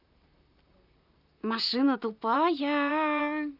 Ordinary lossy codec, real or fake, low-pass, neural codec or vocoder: none; fake; 5.4 kHz; vocoder, 44.1 kHz, 128 mel bands, Pupu-Vocoder